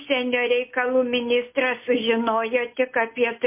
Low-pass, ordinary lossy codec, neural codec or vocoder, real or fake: 3.6 kHz; MP3, 24 kbps; none; real